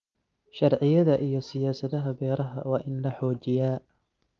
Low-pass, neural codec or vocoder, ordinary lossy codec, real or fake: 7.2 kHz; none; Opus, 24 kbps; real